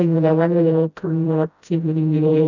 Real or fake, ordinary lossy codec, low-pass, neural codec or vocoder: fake; none; 7.2 kHz; codec, 16 kHz, 0.5 kbps, FreqCodec, smaller model